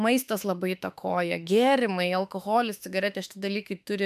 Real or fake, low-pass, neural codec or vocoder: fake; 14.4 kHz; autoencoder, 48 kHz, 32 numbers a frame, DAC-VAE, trained on Japanese speech